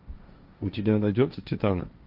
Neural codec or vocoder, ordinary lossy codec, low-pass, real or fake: codec, 16 kHz, 1.1 kbps, Voila-Tokenizer; Opus, 24 kbps; 5.4 kHz; fake